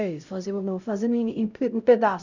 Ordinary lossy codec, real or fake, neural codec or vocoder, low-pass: none; fake; codec, 16 kHz, 0.5 kbps, X-Codec, HuBERT features, trained on LibriSpeech; 7.2 kHz